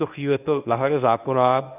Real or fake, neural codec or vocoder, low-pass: fake; codec, 24 kHz, 0.9 kbps, WavTokenizer, medium speech release version 1; 3.6 kHz